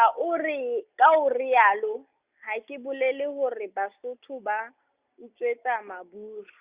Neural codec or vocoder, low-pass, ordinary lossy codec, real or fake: none; 3.6 kHz; Opus, 64 kbps; real